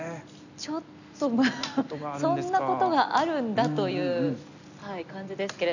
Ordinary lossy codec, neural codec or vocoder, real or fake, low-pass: none; none; real; 7.2 kHz